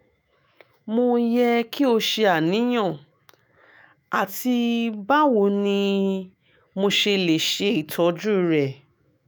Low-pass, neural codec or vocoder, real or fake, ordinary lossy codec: none; autoencoder, 48 kHz, 128 numbers a frame, DAC-VAE, trained on Japanese speech; fake; none